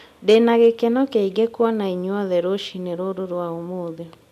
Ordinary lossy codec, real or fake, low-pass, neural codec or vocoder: none; real; 14.4 kHz; none